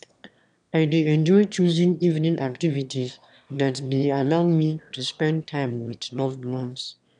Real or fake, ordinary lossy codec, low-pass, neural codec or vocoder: fake; none; 9.9 kHz; autoencoder, 22.05 kHz, a latent of 192 numbers a frame, VITS, trained on one speaker